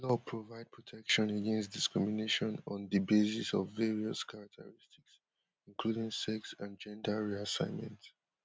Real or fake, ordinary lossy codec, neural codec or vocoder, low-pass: real; none; none; none